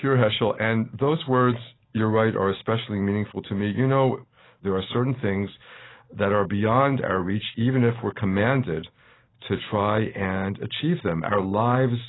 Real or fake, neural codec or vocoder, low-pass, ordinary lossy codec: real; none; 7.2 kHz; AAC, 16 kbps